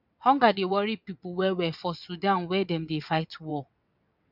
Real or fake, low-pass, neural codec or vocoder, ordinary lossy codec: fake; 5.4 kHz; vocoder, 22.05 kHz, 80 mel bands, WaveNeXt; none